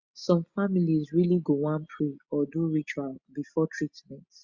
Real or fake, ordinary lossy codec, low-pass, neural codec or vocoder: real; Opus, 64 kbps; 7.2 kHz; none